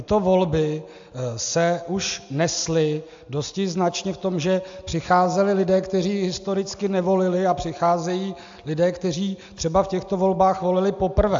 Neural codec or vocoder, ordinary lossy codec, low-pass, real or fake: none; AAC, 64 kbps; 7.2 kHz; real